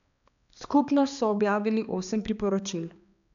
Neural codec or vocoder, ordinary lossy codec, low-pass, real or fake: codec, 16 kHz, 2 kbps, X-Codec, HuBERT features, trained on balanced general audio; none; 7.2 kHz; fake